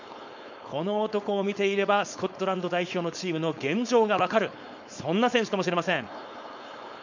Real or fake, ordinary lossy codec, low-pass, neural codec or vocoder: fake; none; 7.2 kHz; codec, 16 kHz, 4.8 kbps, FACodec